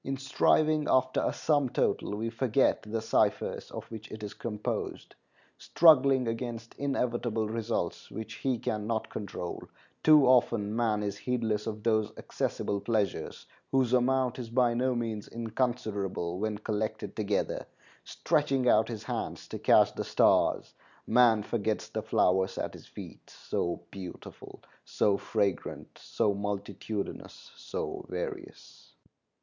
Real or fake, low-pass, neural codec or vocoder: real; 7.2 kHz; none